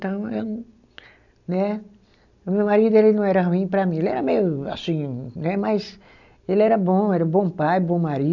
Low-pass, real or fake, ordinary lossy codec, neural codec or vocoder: 7.2 kHz; real; none; none